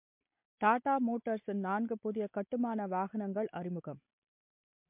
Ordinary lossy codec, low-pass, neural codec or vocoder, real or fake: MP3, 32 kbps; 3.6 kHz; none; real